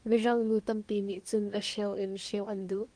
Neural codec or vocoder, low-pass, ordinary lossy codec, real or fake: codec, 24 kHz, 1 kbps, SNAC; 9.9 kHz; Opus, 24 kbps; fake